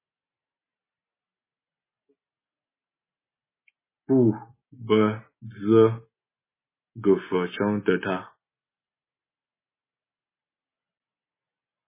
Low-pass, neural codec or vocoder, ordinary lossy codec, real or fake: 3.6 kHz; none; MP3, 16 kbps; real